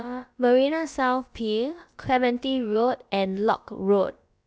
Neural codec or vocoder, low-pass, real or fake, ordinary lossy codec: codec, 16 kHz, about 1 kbps, DyCAST, with the encoder's durations; none; fake; none